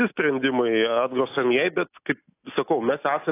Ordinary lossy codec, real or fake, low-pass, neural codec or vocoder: AAC, 24 kbps; real; 3.6 kHz; none